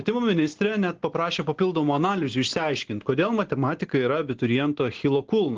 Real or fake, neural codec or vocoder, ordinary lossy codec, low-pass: real; none; Opus, 16 kbps; 7.2 kHz